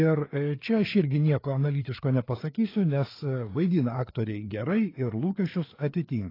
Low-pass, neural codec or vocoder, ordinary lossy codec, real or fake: 5.4 kHz; codec, 16 kHz, 4 kbps, FreqCodec, larger model; AAC, 24 kbps; fake